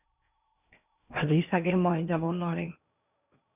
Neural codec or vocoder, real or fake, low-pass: codec, 16 kHz in and 24 kHz out, 0.8 kbps, FocalCodec, streaming, 65536 codes; fake; 3.6 kHz